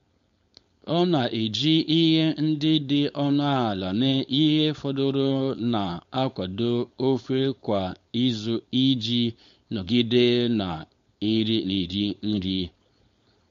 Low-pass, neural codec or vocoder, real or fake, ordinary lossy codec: 7.2 kHz; codec, 16 kHz, 4.8 kbps, FACodec; fake; MP3, 48 kbps